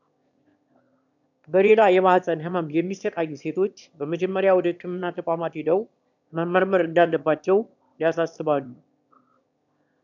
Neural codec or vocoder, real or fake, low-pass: autoencoder, 22.05 kHz, a latent of 192 numbers a frame, VITS, trained on one speaker; fake; 7.2 kHz